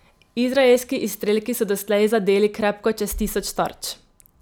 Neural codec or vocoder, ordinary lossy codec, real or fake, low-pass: none; none; real; none